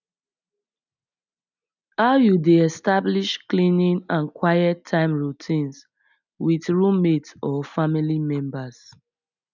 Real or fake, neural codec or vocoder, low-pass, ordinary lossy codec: real; none; 7.2 kHz; none